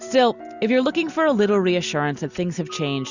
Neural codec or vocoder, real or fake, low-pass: none; real; 7.2 kHz